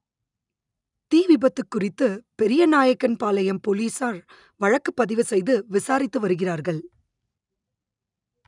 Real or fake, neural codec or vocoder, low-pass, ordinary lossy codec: real; none; 10.8 kHz; none